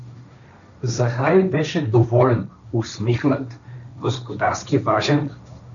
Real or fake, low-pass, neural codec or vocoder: fake; 7.2 kHz; codec, 16 kHz, 1.1 kbps, Voila-Tokenizer